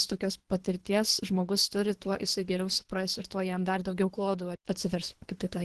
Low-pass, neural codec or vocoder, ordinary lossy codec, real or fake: 10.8 kHz; codec, 24 kHz, 3 kbps, HILCodec; Opus, 16 kbps; fake